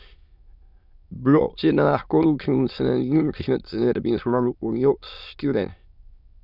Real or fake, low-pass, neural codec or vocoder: fake; 5.4 kHz; autoencoder, 22.05 kHz, a latent of 192 numbers a frame, VITS, trained on many speakers